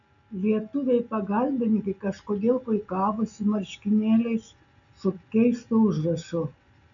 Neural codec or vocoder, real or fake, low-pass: none; real; 7.2 kHz